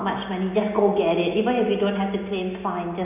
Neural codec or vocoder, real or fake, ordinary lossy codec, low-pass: none; real; none; 3.6 kHz